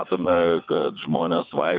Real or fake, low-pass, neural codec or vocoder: fake; 7.2 kHz; vocoder, 22.05 kHz, 80 mel bands, WaveNeXt